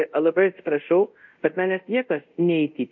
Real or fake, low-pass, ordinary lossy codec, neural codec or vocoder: fake; 7.2 kHz; MP3, 48 kbps; codec, 24 kHz, 0.5 kbps, DualCodec